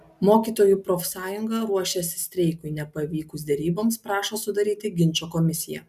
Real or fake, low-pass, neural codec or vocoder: real; 14.4 kHz; none